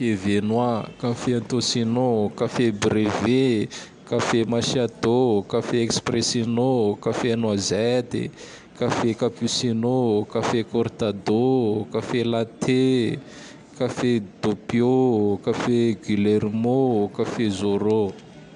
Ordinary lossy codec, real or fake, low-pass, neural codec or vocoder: AAC, 96 kbps; real; 10.8 kHz; none